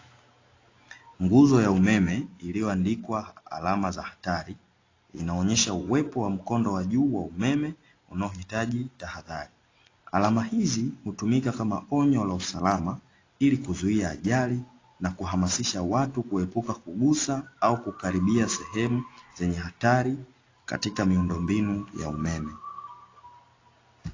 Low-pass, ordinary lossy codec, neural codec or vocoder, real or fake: 7.2 kHz; AAC, 32 kbps; none; real